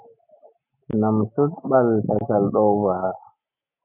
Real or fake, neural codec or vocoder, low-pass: real; none; 3.6 kHz